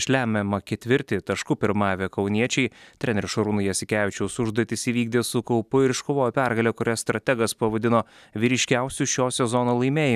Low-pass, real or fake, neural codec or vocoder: 14.4 kHz; real; none